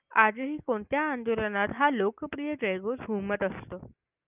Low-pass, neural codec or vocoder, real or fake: 3.6 kHz; none; real